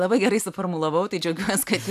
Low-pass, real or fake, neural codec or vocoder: 14.4 kHz; real; none